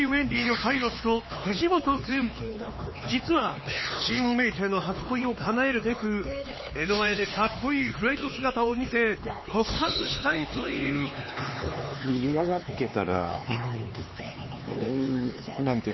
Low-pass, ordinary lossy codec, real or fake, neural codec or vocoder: 7.2 kHz; MP3, 24 kbps; fake; codec, 16 kHz, 4 kbps, X-Codec, HuBERT features, trained on LibriSpeech